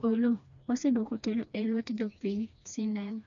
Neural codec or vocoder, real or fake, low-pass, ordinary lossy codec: codec, 16 kHz, 2 kbps, FreqCodec, smaller model; fake; 7.2 kHz; none